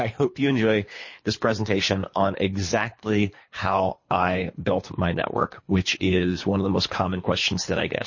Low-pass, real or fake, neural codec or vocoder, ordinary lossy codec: 7.2 kHz; fake; codec, 24 kHz, 3 kbps, HILCodec; MP3, 32 kbps